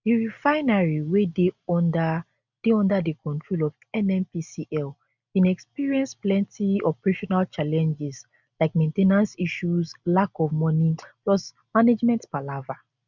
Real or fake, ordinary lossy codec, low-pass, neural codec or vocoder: real; none; 7.2 kHz; none